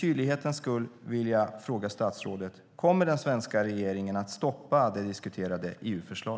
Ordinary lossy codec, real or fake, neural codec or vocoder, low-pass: none; real; none; none